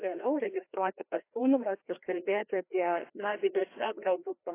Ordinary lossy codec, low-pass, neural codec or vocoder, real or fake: AAC, 24 kbps; 3.6 kHz; codec, 16 kHz, 1 kbps, FreqCodec, larger model; fake